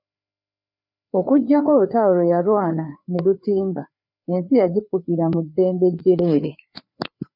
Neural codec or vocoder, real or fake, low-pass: codec, 16 kHz, 4 kbps, FreqCodec, larger model; fake; 5.4 kHz